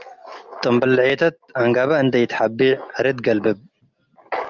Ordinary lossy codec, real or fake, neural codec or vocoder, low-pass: Opus, 24 kbps; real; none; 7.2 kHz